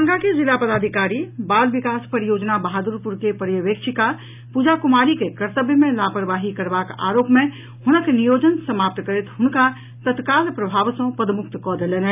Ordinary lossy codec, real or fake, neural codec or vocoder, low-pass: none; real; none; 3.6 kHz